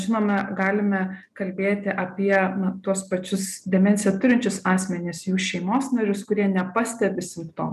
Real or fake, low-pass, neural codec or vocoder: real; 14.4 kHz; none